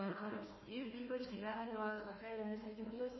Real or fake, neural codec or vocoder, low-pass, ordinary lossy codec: fake; codec, 16 kHz, 1 kbps, FunCodec, trained on Chinese and English, 50 frames a second; 7.2 kHz; MP3, 24 kbps